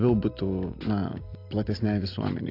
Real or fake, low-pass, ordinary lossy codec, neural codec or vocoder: real; 5.4 kHz; AAC, 32 kbps; none